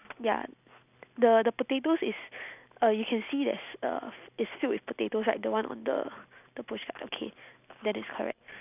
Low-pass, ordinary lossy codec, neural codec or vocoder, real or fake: 3.6 kHz; none; none; real